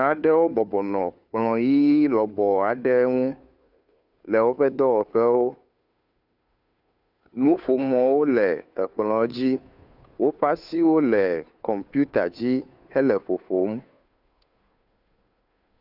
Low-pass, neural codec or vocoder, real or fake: 5.4 kHz; codec, 16 kHz, 2 kbps, FunCodec, trained on Chinese and English, 25 frames a second; fake